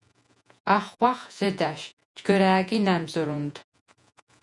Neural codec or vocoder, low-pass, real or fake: vocoder, 48 kHz, 128 mel bands, Vocos; 10.8 kHz; fake